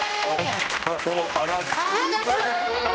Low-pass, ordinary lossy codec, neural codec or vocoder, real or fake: none; none; codec, 16 kHz, 1 kbps, X-Codec, HuBERT features, trained on general audio; fake